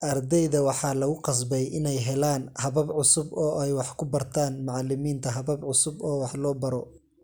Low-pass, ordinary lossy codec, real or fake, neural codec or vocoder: none; none; real; none